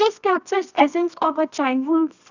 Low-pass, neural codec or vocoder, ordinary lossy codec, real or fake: 7.2 kHz; codec, 24 kHz, 0.9 kbps, WavTokenizer, medium music audio release; none; fake